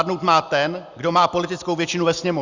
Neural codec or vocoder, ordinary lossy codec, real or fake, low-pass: none; Opus, 64 kbps; real; 7.2 kHz